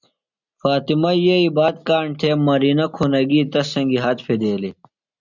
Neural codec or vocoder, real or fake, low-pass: none; real; 7.2 kHz